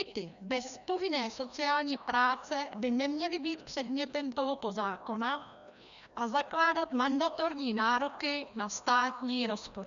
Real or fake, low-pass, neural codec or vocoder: fake; 7.2 kHz; codec, 16 kHz, 1 kbps, FreqCodec, larger model